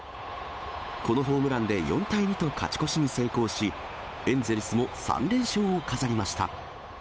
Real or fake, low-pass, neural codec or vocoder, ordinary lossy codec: fake; none; codec, 16 kHz, 8 kbps, FunCodec, trained on Chinese and English, 25 frames a second; none